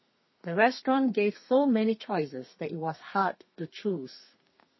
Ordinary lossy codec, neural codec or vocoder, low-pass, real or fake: MP3, 24 kbps; codec, 32 kHz, 1.9 kbps, SNAC; 7.2 kHz; fake